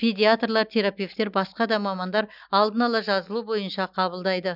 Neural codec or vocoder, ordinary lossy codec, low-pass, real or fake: none; none; 5.4 kHz; real